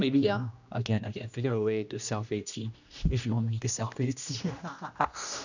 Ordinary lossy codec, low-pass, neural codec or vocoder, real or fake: none; 7.2 kHz; codec, 16 kHz, 1 kbps, X-Codec, HuBERT features, trained on general audio; fake